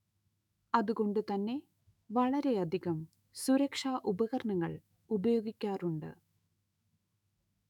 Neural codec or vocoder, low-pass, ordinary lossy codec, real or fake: autoencoder, 48 kHz, 128 numbers a frame, DAC-VAE, trained on Japanese speech; 19.8 kHz; none; fake